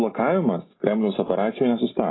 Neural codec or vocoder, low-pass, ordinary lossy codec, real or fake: none; 7.2 kHz; AAC, 16 kbps; real